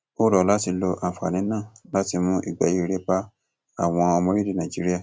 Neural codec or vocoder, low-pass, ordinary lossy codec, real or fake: none; none; none; real